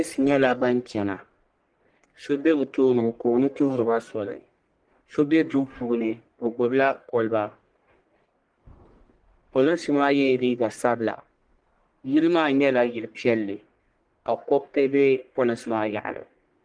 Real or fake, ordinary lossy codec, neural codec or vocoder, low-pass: fake; Opus, 24 kbps; codec, 44.1 kHz, 1.7 kbps, Pupu-Codec; 9.9 kHz